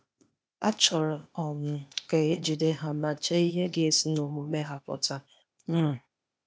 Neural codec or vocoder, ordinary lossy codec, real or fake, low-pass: codec, 16 kHz, 0.8 kbps, ZipCodec; none; fake; none